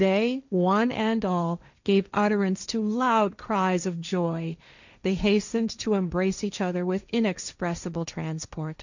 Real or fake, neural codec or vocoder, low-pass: fake; codec, 16 kHz, 1.1 kbps, Voila-Tokenizer; 7.2 kHz